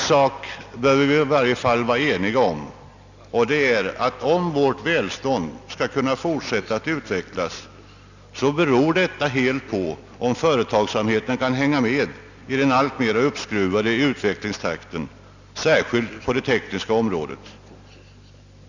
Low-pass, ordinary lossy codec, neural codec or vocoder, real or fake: 7.2 kHz; none; none; real